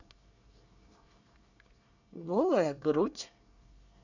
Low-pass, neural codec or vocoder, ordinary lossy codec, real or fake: 7.2 kHz; codec, 24 kHz, 1 kbps, SNAC; none; fake